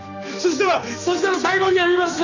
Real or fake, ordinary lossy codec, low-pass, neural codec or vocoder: fake; none; 7.2 kHz; codec, 16 kHz, 2 kbps, X-Codec, HuBERT features, trained on general audio